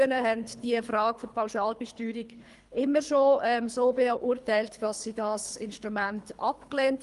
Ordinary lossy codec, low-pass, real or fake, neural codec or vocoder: Opus, 32 kbps; 10.8 kHz; fake; codec, 24 kHz, 3 kbps, HILCodec